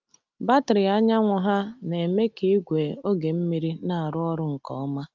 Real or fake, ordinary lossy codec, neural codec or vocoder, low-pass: real; Opus, 24 kbps; none; 7.2 kHz